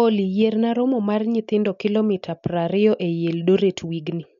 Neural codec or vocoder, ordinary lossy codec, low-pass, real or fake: none; none; 7.2 kHz; real